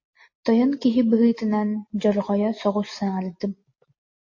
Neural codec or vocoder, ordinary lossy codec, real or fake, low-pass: none; MP3, 32 kbps; real; 7.2 kHz